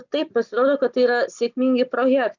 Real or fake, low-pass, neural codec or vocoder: real; 7.2 kHz; none